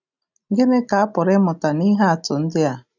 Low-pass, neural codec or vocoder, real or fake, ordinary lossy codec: 7.2 kHz; none; real; none